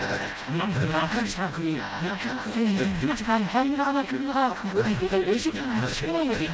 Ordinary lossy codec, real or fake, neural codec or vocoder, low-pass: none; fake; codec, 16 kHz, 0.5 kbps, FreqCodec, smaller model; none